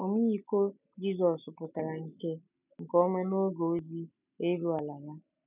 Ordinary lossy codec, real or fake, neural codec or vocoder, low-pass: none; real; none; 3.6 kHz